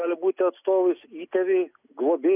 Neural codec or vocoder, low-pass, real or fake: none; 3.6 kHz; real